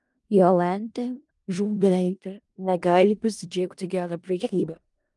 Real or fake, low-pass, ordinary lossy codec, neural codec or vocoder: fake; 10.8 kHz; Opus, 32 kbps; codec, 16 kHz in and 24 kHz out, 0.4 kbps, LongCat-Audio-Codec, four codebook decoder